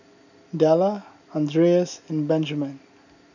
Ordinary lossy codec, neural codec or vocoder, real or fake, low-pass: none; none; real; 7.2 kHz